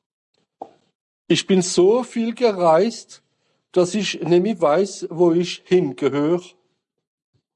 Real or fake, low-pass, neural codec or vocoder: real; 10.8 kHz; none